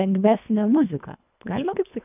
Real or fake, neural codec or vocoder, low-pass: fake; codec, 24 kHz, 1.5 kbps, HILCodec; 3.6 kHz